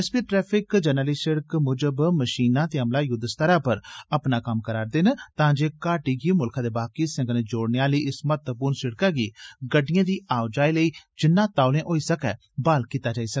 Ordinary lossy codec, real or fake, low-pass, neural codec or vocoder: none; real; none; none